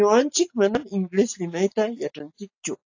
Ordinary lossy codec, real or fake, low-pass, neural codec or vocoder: AAC, 48 kbps; real; 7.2 kHz; none